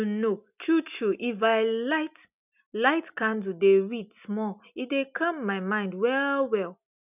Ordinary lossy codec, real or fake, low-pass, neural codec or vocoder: none; real; 3.6 kHz; none